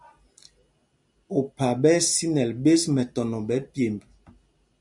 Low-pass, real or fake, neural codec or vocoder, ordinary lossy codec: 10.8 kHz; real; none; MP3, 96 kbps